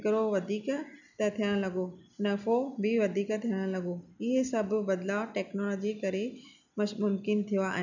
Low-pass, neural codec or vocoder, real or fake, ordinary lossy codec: 7.2 kHz; none; real; none